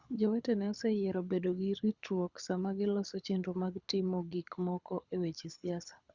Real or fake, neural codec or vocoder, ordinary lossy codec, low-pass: fake; codec, 24 kHz, 6 kbps, HILCodec; Opus, 64 kbps; 7.2 kHz